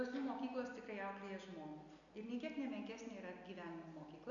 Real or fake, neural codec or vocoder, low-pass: real; none; 7.2 kHz